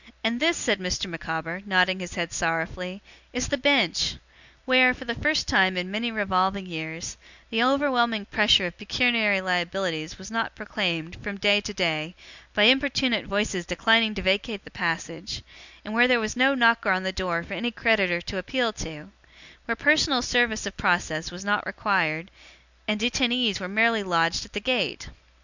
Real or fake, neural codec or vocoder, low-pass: real; none; 7.2 kHz